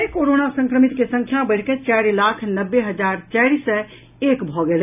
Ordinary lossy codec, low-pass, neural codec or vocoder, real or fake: none; 3.6 kHz; none; real